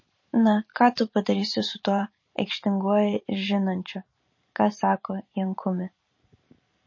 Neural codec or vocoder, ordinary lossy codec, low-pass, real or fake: vocoder, 44.1 kHz, 128 mel bands every 512 samples, BigVGAN v2; MP3, 32 kbps; 7.2 kHz; fake